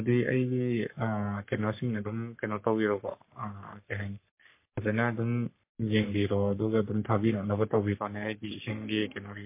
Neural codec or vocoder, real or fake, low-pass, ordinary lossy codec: codec, 44.1 kHz, 3.4 kbps, Pupu-Codec; fake; 3.6 kHz; MP3, 24 kbps